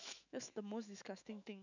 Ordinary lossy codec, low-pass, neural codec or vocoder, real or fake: none; 7.2 kHz; autoencoder, 48 kHz, 128 numbers a frame, DAC-VAE, trained on Japanese speech; fake